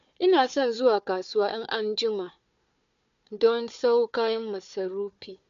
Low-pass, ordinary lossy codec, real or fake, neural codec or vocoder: 7.2 kHz; MP3, 64 kbps; fake; codec, 16 kHz, 4 kbps, FunCodec, trained on Chinese and English, 50 frames a second